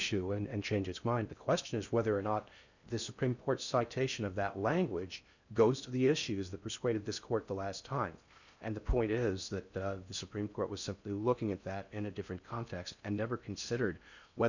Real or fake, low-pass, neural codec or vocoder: fake; 7.2 kHz; codec, 16 kHz in and 24 kHz out, 0.6 kbps, FocalCodec, streaming, 4096 codes